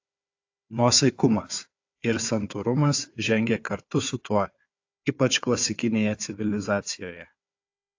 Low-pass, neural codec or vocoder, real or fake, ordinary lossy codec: 7.2 kHz; codec, 16 kHz, 4 kbps, FunCodec, trained on Chinese and English, 50 frames a second; fake; AAC, 48 kbps